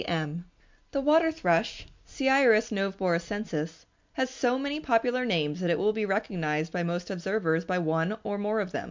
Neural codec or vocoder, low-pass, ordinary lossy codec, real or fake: none; 7.2 kHz; MP3, 64 kbps; real